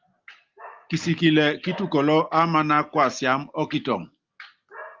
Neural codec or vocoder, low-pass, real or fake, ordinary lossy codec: none; 7.2 kHz; real; Opus, 32 kbps